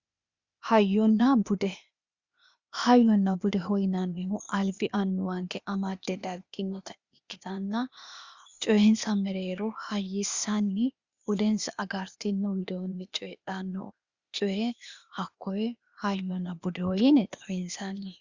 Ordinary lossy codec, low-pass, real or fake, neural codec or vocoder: Opus, 64 kbps; 7.2 kHz; fake; codec, 16 kHz, 0.8 kbps, ZipCodec